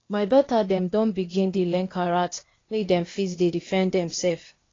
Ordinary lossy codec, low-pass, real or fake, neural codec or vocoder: AAC, 32 kbps; 7.2 kHz; fake; codec, 16 kHz, 0.8 kbps, ZipCodec